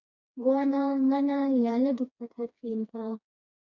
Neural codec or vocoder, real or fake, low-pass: codec, 16 kHz, 2 kbps, FreqCodec, smaller model; fake; 7.2 kHz